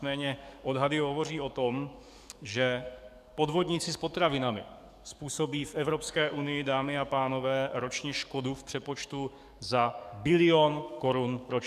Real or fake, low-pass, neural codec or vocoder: fake; 14.4 kHz; codec, 44.1 kHz, 7.8 kbps, DAC